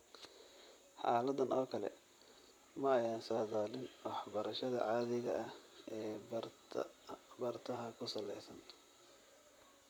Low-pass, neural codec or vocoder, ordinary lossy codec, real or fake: none; vocoder, 44.1 kHz, 128 mel bands, Pupu-Vocoder; none; fake